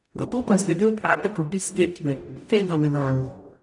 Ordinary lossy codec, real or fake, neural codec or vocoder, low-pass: none; fake; codec, 44.1 kHz, 0.9 kbps, DAC; 10.8 kHz